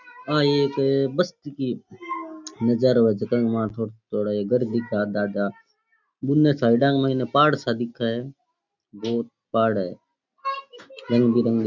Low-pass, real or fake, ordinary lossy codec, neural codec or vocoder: 7.2 kHz; real; none; none